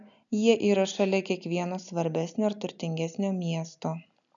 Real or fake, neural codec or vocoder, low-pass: real; none; 7.2 kHz